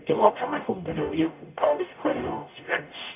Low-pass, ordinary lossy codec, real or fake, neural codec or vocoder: 3.6 kHz; none; fake; codec, 44.1 kHz, 0.9 kbps, DAC